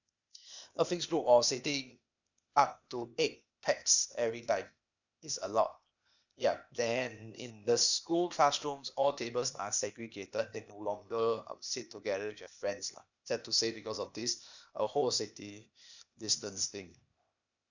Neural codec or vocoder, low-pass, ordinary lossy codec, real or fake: codec, 16 kHz, 0.8 kbps, ZipCodec; 7.2 kHz; none; fake